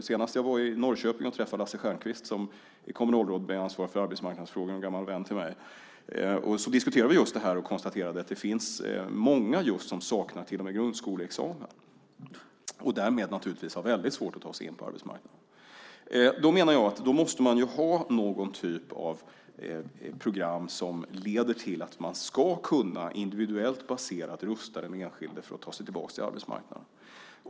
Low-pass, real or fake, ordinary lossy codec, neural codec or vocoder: none; real; none; none